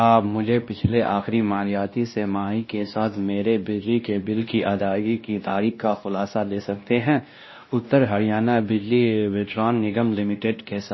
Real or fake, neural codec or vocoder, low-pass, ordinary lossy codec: fake; codec, 16 kHz, 1 kbps, X-Codec, WavLM features, trained on Multilingual LibriSpeech; 7.2 kHz; MP3, 24 kbps